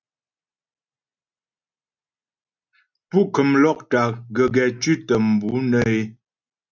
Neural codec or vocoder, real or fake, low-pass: none; real; 7.2 kHz